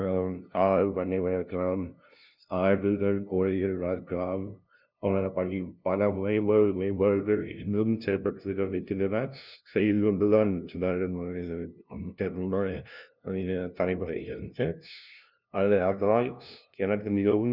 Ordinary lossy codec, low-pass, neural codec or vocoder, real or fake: none; 5.4 kHz; codec, 16 kHz, 0.5 kbps, FunCodec, trained on LibriTTS, 25 frames a second; fake